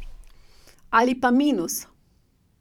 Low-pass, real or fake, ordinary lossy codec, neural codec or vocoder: 19.8 kHz; fake; none; vocoder, 44.1 kHz, 128 mel bands every 512 samples, BigVGAN v2